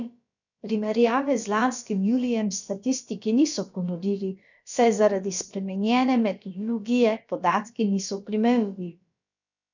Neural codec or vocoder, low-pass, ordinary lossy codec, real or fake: codec, 16 kHz, about 1 kbps, DyCAST, with the encoder's durations; 7.2 kHz; none; fake